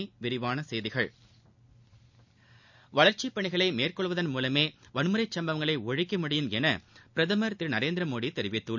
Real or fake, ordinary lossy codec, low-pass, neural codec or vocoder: real; none; 7.2 kHz; none